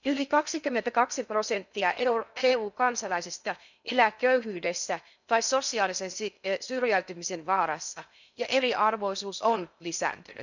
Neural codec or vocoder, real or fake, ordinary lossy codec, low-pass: codec, 16 kHz in and 24 kHz out, 0.6 kbps, FocalCodec, streaming, 2048 codes; fake; none; 7.2 kHz